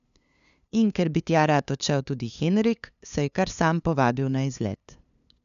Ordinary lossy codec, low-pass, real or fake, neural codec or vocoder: none; 7.2 kHz; fake; codec, 16 kHz, 2 kbps, FunCodec, trained on LibriTTS, 25 frames a second